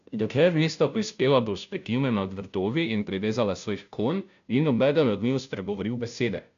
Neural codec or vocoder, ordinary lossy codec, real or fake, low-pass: codec, 16 kHz, 0.5 kbps, FunCodec, trained on Chinese and English, 25 frames a second; AAC, 96 kbps; fake; 7.2 kHz